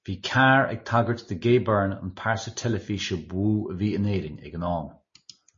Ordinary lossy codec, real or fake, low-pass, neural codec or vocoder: MP3, 32 kbps; real; 7.2 kHz; none